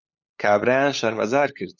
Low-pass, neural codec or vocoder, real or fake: 7.2 kHz; codec, 16 kHz, 8 kbps, FunCodec, trained on LibriTTS, 25 frames a second; fake